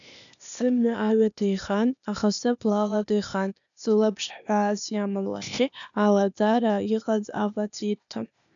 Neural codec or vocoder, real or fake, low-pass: codec, 16 kHz, 0.8 kbps, ZipCodec; fake; 7.2 kHz